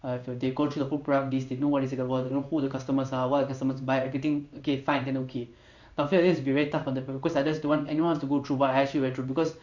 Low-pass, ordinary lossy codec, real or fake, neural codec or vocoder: 7.2 kHz; none; fake; codec, 16 kHz in and 24 kHz out, 1 kbps, XY-Tokenizer